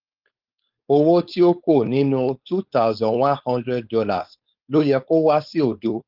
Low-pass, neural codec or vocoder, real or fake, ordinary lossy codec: 5.4 kHz; codec, 16 kHz, 4.8 kbps, FACodec; fake; Opus, 32 kbps